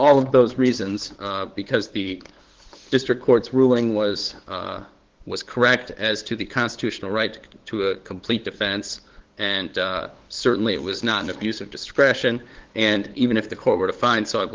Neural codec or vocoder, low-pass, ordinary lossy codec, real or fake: codec, 16 kHz, 8 kbps, FunCodec, trained on LibriTTS, 25 frames a second; 7.2 kHz; Opus, 16 kbps; fake